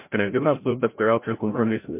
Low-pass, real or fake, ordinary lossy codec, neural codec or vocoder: 3.6 kHz; fake; MP3, 24 kbps; codec, 16 kHz, 0.5 kbps, FreqCodec, larger model